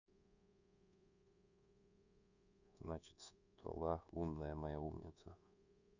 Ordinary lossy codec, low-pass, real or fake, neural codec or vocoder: none; 7.2 kHz; fake; codec, 24 kHz, 1.2 kbps, DualCodec